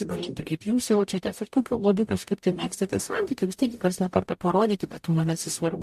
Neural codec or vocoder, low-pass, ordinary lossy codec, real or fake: codec, 44.1 kHz, 0.9 kbps, DAC; 14.4 kHz; MP3, 64 kbps; fake